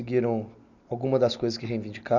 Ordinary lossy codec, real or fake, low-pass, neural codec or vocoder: none; real; 7.2 kHz; none